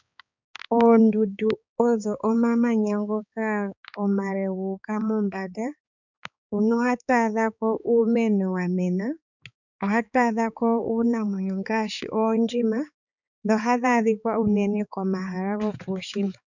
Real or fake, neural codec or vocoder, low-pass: fake; codec, 16 kHz, 4 kbps, X-Codec, HuBERT features, trained on balanced general audio; 7.2 kHz